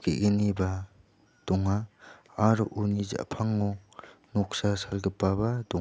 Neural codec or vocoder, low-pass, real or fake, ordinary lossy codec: none; none; real; none